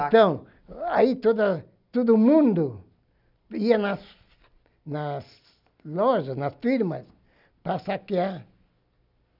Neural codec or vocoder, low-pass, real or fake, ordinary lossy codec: none; 5.4 kHz; real; none